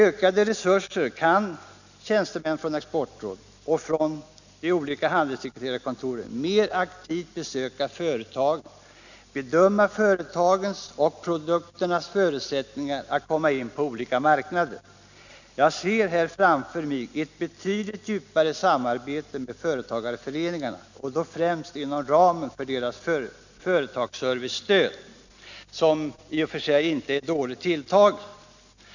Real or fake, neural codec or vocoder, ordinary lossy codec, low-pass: real; none; none; 7.2 kHz